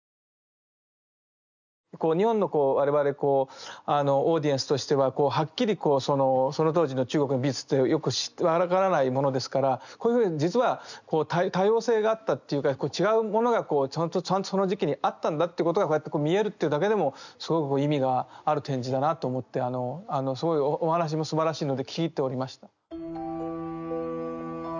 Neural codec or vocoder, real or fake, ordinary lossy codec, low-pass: none; real; none; 7.2 kHz